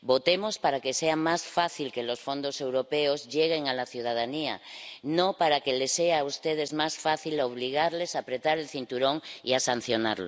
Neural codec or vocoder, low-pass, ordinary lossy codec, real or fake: none; none; none; real